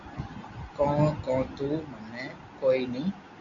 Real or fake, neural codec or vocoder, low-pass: real; none; 7.2 kHz